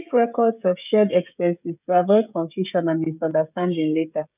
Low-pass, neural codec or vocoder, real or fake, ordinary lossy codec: 3.6 kHz; codec, 16 kHz, 16 kbps, FreqCodec, smaller model; fake; none